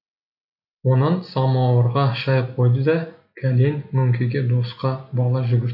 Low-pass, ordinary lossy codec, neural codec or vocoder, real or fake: 5.4 kHz; AAC, 48 kbps; none; real